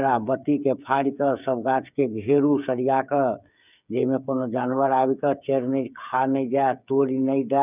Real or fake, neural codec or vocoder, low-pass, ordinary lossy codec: fake; codec, 16 kHz, 8 kbps, FreqCodec, smaller model; 3.6 kHz; none